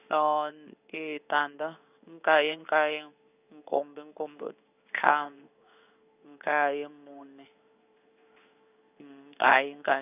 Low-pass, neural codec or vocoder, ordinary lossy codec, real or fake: 3.6 kHz; codec, 16 kHz in and 24 kHz out, 1 kbps, XY-Tokenizer; none; fake